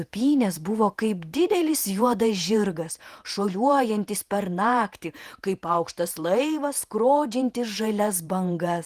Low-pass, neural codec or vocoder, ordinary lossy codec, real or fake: 14.4 kHz; vocoder, 48 kHz, 128 mel bands, Vocos; Opus, 24 kbps; fake